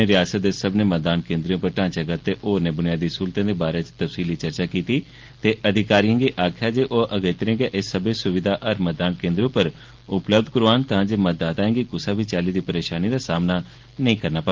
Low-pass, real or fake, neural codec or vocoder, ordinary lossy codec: 7.2 kHz; real; none; Opus, 16 kbps